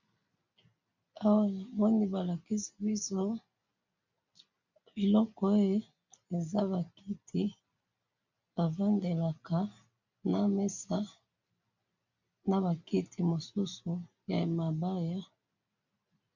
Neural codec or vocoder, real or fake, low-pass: none; real; 7.2 kHz